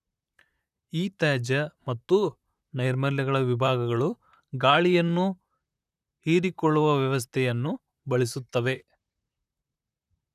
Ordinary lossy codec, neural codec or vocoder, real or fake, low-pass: none; vocoder, 44.1 kHz, 128 mel bands, Pupu-Vocoder; fake; 14.4 kHz